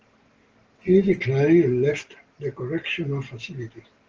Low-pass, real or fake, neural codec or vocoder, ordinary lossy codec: 7.2 kHz; real; none; Opus, 16 kbps